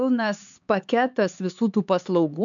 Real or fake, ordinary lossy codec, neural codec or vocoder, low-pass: fake; MP3, 96 kbps; codec, 16 kHz, 4 kbps, X-Codec, HuBERT features, trained on LibriSpeech; 7.2 kHz